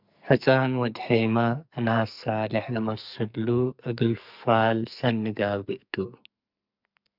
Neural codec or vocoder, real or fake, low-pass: codec, 44.1 kHz, 2.6 kbps, SNAC; fake; 5.4 kHz